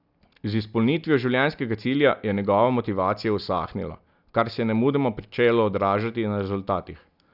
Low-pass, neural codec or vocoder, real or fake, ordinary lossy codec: 5.4 kHz; none; real; none